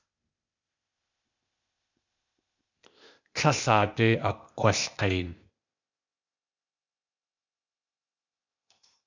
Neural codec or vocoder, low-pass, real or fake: codec, 16 kHz, 0.8 kbps, ZipCodec; 7.2 kHz; fake